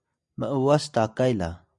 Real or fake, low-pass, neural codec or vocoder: real; 10.8 kHz; none